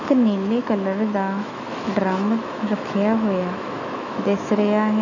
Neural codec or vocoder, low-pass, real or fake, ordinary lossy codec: autoencoder, 48 kHz, 128 numbers a frame, DAC-VAE, trained on Japanese speech; 7.2 kHz; fake; none